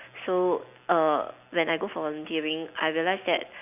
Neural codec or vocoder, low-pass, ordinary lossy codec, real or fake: none; 3.6 kHz; none; real